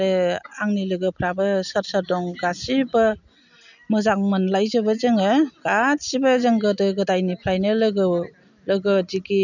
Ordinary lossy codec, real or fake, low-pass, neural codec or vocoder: none; real; 7.2 kHz; none